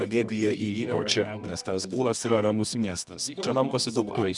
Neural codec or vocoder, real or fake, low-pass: codec, 24 kHz, 0.9 kbps, WavTokenizer, medium music audio release; fake; 10.8 kHz